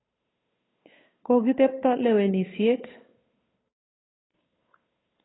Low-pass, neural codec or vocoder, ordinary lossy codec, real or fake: 7.2 kHz; codec, 16 kHz, 8 kbps, FunCodec, trained on Chinese and English, 25 frames a second; AAC, 16 kbps; fake